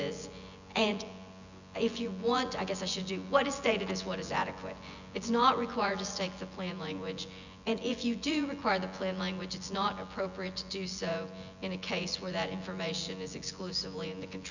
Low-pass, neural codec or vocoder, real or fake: 7.2 kHz; vocoder, 24 kHz, 100 mel bands, Vocos; fake